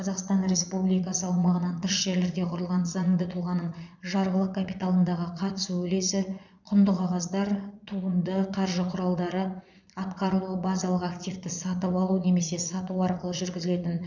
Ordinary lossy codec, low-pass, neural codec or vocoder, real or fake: none; 7.2 kHz; vocoder, 22.05 kHz, 80 mel bands, Vocos; fake